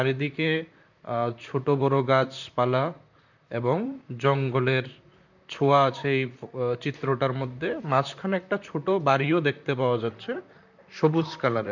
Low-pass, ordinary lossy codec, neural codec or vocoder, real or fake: 7.2 kHz; none; vocoder, 44.1 kHz, 128 mel bands, Pupu-Vocoder; fake